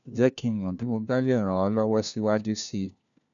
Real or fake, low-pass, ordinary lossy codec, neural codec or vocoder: fake; 7.2 kHz; none; codec, 16 kHz, 1 kbps, FunCodec, trained on LibriTTS, 50 frames a second